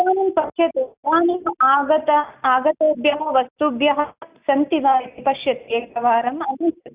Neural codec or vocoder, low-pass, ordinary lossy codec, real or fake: none; 3.6 kHz; Opus, 64 kbps; real